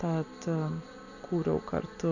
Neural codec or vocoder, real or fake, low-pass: none; real; 7.2 kHz